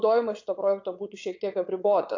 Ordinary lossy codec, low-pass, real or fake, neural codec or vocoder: AAC, 48 kbps; 7.2 kHz; fake; vocoder, 22.05 kHz, 80 mel bands, Vocos